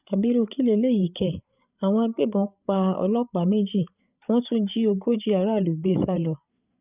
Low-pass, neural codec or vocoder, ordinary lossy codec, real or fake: 3.6 kHz; codec, 16 kHz, 8 kbps, FreqCodec, larger model; none; fake